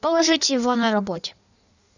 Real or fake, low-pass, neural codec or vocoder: fake; 7.2 kHz; codec, 16 kHz in and 24 kHz out, 1.1 kbps, FireRedTTS-2 codec